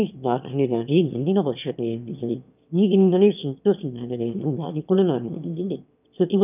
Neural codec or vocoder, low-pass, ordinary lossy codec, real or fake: autoencoder, 22.05 kHz, a latent of 192 numbers a frame, VITS, trained on one speaker; 3.6 kHz; none; fake